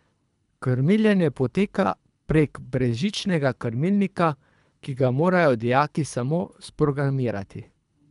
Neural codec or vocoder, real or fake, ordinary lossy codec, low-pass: codec, 24 kHz, 3 kbps, HILCodec; fake; none; 10.8 kHz